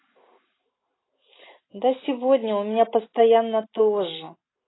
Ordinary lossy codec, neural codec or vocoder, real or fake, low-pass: AAC, 16 kbps; none; real; 7.2 kHz